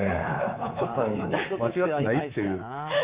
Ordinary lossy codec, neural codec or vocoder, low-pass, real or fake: Opus, 64 kbps; codec, 24 kHz, 3.1 kbps, DualCodec; 3.6 kHz; fake